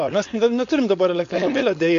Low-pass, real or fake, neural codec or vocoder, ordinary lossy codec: 7.2 kHz; fake; codec, 16 kHz, 4.8 kbps, FACodec; AAC, 64 kbps